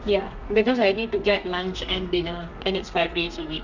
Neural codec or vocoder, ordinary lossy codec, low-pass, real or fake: codec, 32 kHz, 1.9 kbps, SNAC; none; 7.2 kHz; fake